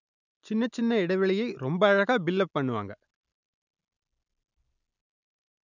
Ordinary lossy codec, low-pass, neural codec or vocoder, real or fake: none; 7.2 kHz; none; real